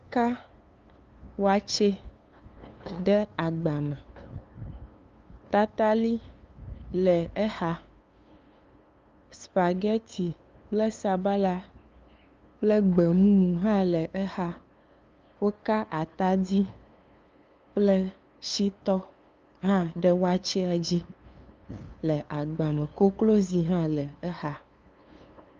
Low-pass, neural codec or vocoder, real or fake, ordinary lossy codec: 7.2 kHz; codec, 16 kHz, 2 kbps, FunCodec, trained on LibriTTS, 25 frames a second; fake; Opus, 24 kbps